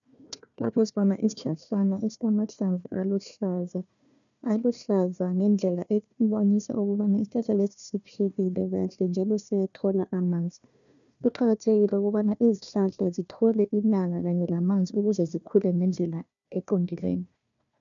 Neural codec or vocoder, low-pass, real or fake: codec, 16 kHz, 1 kbps, FunCodec, trained on Chinese and English, 50 frames a second; 7.2 kHz; fake